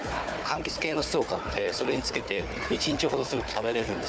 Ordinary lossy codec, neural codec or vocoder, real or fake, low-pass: none; codec, 16 kHz, 4 kbps, FunCodec, trained on Chinese and English, 50 frames a second; fake; none